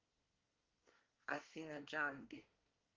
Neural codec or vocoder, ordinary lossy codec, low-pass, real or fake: codec, 24 kHz, 1 kbps, SNAC; Opus, 32 kbps; 7.2 kHz; fake